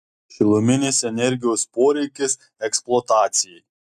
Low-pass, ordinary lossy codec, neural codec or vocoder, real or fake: 14.4 kHz; AAC, 96 kbps; none; real